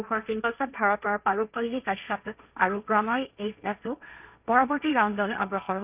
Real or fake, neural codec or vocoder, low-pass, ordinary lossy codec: fake; codec, 16 kHz, 1.1 kbps, Voila-Tokenizer; 3.6 kHz; none